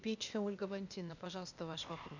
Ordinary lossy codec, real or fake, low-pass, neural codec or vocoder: none; fake; 7.2 kHz; codec, 16 kHz, 0.8 kbps, ZipCodec